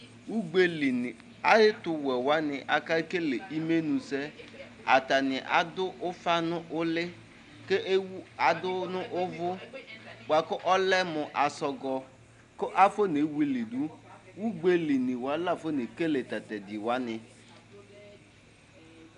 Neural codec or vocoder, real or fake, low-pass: none; real; 10.8 kHz